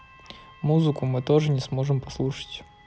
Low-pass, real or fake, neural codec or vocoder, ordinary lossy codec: none; real; none; none